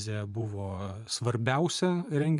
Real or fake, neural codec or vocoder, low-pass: fake; vocoder, 24 kHz, 100 mel bands, Vocos; 10.8 kHz